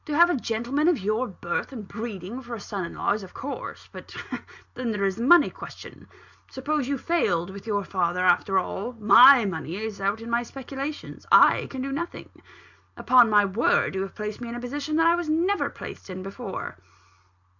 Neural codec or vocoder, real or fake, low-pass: none; real; 7.2 kHz